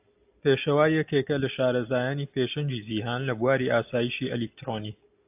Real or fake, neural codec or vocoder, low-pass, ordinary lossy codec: real; none; 3.6 kHz; AAC, 32 kbps